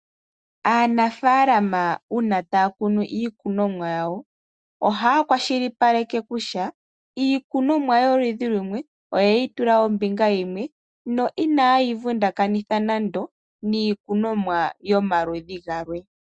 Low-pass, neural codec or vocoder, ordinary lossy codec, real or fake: 9.9 kHz; none; Opus, 64 kbps; real